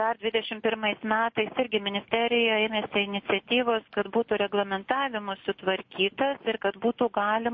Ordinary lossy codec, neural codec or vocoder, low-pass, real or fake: MP3, 32 kbps; none; 7.2 kHz; real